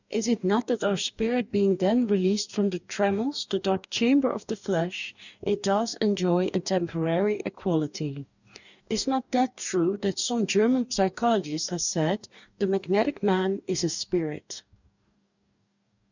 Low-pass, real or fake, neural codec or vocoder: 7.2 kHz; fake; codec, 44.1 kHz, 2.6 kbps, DAC